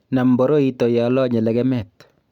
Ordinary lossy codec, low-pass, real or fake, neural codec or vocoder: none; 19.8 kHz; real; none